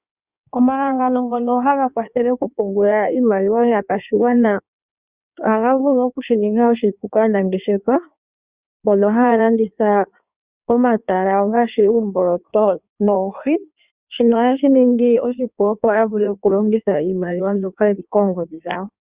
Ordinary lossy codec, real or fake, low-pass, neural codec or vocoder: Opus, 64 kbps; fake; 3.6 kHz; codec, 16 kHz in and 24 kHz out, 1.1 kbps, FireRedTTS-2 codec